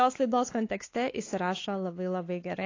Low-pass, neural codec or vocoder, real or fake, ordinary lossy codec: 7.2 kHz; codec, 16 kHz, 4 kbps, X-Codec, WavLM features, trained on Multilingual LibriSpeech; fake; AAC, 32 kbps